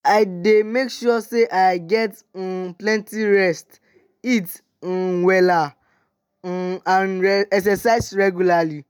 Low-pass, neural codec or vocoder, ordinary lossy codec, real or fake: none; none; none; real